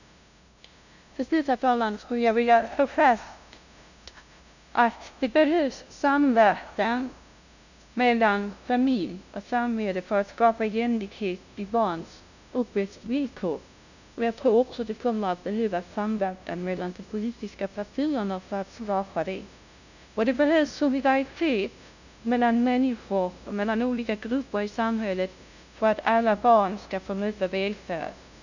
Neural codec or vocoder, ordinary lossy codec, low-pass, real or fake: codec, 16 kHz, 0.5 kbps, FunCodec, trained on LibriTTS, 25 frames a second; none; 7.2 kHz; fake